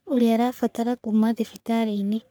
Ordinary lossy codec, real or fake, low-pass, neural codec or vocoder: none; fake; none; codec, 44.1 kHz, 3.4 kbps, Pupu-Codec